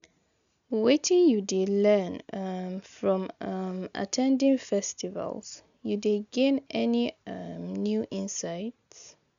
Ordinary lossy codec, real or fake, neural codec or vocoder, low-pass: none; real; none; 7.2 kHz